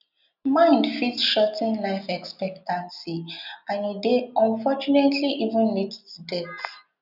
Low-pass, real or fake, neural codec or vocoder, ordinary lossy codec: 5.4 kHz; real; none; none